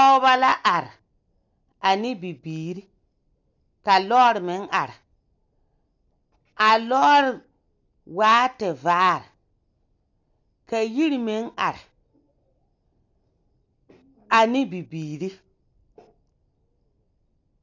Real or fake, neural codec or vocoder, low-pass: real; none; 7.2 kHz